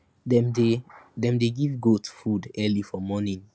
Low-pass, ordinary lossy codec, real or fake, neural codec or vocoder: none; none; real; none